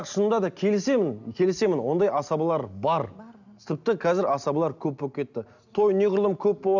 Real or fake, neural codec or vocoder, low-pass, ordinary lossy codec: real; none; 7.2 kHz; none